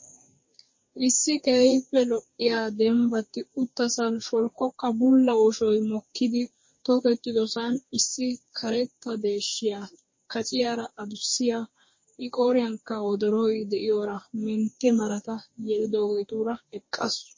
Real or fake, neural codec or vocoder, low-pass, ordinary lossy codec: fake; codec, 44.1 kHz, 2.6 kbps, DAC; 7.2 kHz; MP3, 32 kbps